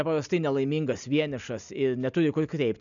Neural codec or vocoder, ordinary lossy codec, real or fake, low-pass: none; MP3, 96 kbps; real; 7.2 kHz